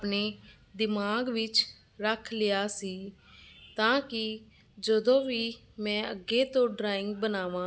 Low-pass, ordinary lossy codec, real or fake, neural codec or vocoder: none; none; real; none